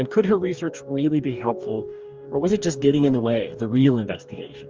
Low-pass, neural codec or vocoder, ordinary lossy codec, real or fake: 7.2 kHz; codec, 44.1 kHz, 2.6 kbps, DAC; Opus, 24 kbps; fake